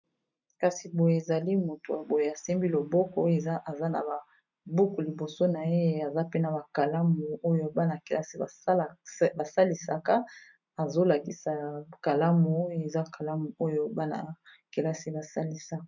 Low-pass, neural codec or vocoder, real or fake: 7.2 kHz; none; real